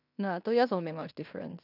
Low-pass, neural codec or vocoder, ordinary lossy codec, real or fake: 5.4 kHz; codec, 16 kHz in and 24 kHz out, 0.9 kbps, LongCat-Audio-Codec, fine tuned four codebook decoder; none; fake